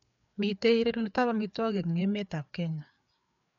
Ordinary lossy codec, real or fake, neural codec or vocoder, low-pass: none; fake; codec, 16 kHz, 4 kbps, FreqCodec, larger model; 7.2 kHz